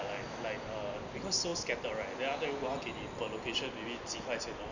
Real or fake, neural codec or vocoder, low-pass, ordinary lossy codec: real; none; 7.2 kHz; none